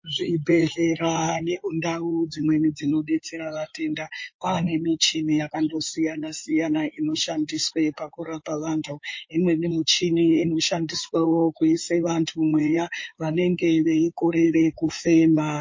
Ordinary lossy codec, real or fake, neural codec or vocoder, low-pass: MP3, 32 kbps; fake; codec, 16 kHz in and 24 kHz out, 2.2 kbps, FireRedTTS-2 codec; 7.2 kHz